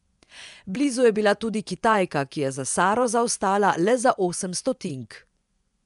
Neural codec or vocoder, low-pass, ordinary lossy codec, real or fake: vocoder, 24 kHz, 100 mel bands, Vocos; 10.8 kHz; none; fake